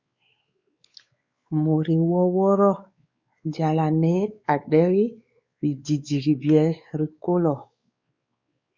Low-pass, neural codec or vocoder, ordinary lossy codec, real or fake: 7.2 kHz; codec, 16 kHz, 4 kbps, X-Codec, WavLM features, trained on Multilingual LibriSpeech; Opus, 64 kbps; fake